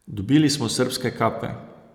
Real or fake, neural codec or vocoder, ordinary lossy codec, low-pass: real; none; none; 19.8 kHz